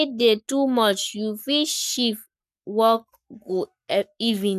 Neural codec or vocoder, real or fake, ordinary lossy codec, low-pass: codec, 44.1 kHz, 7.8 kbps, Pupu-Codec; fake; none; 14.4 kHz